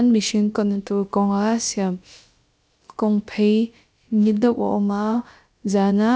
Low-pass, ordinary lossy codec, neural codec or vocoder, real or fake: none; none; codec, 16 kHz, about 1 kbps, DyCAST, with the encoder's durations; fake